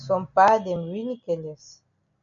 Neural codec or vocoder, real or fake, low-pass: none; real; 7.2 kHz